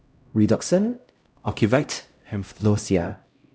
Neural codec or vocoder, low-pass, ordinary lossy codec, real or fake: codec, 16 kHz, 0.5 kbps, X-Codec, HuBERT features, trained on LibriSpeech; none; none; fake